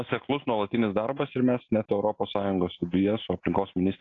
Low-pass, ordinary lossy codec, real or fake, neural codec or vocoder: 7.2 kHz; AAC, 32 kbps; real; none